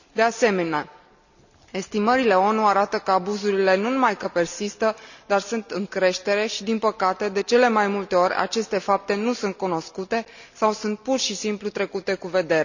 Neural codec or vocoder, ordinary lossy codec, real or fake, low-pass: none; none; real; 7.2 kHz